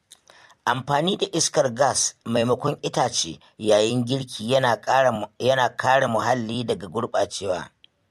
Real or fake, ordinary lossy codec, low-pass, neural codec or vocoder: fake; MP3, 64 kbps; 14.4 kHz; vocoder, 44.1 kHz, 128 mel bands every 256 samples, BigVGAN v2